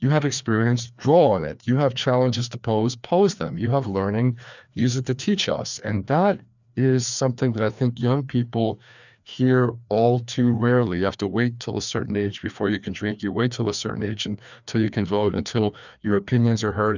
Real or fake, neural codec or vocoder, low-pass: fake; codec, 16 kHz, 2 kbps, FreqCodec, larger model; 7.2 kHz